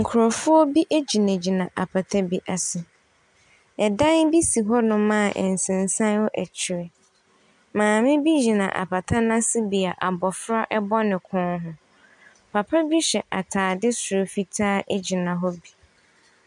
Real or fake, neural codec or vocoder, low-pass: real; none; 10.8 kHz